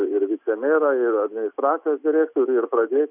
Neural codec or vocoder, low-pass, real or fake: none; 3.6 kHz; real